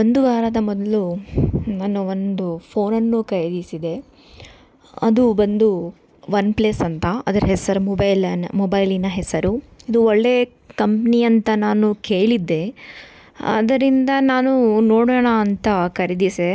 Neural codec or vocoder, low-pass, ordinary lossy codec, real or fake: none; none; none; real